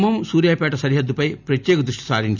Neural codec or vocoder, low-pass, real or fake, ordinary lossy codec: none; 7.2 kHz; real; none